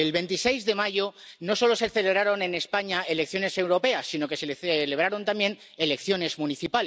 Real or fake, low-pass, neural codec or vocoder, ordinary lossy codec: real; none; none; none